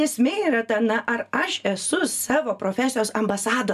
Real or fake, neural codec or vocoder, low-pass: real; none; 14.4 kHz